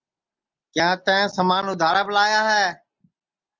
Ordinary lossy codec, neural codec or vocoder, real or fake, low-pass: Opus, 24 kbps; none; real; 7.2 kHz